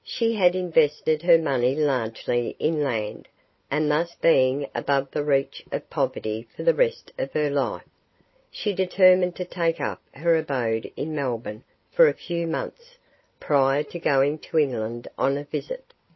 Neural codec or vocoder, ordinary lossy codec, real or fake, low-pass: none; MP3, 24 kbps; real; 7.2 kHz